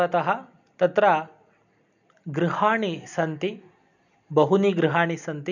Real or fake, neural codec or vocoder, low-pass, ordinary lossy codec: real; none; 7.2 kHz; none